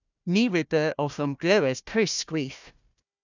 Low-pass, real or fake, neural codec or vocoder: 7.2 kHz; fake; codec, 16 kHz, 1 kbps, FunCodec, trained on Chinese and English, 50 frames a second